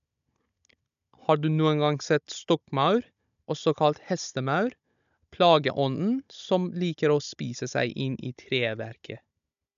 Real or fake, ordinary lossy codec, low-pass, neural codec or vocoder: fake; none; 7.2 kHz; codec, 16 kHz, 16 kbps, FunCodec, trained on Chinese and English, 50 frames a second